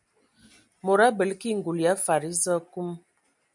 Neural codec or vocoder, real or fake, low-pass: none; real; 10.8 kHz